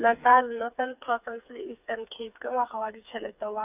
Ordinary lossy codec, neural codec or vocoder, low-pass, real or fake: none; codec, 16 kHz, 4 kbps, FreqCodec, smaller model; 3.6 kHz; fake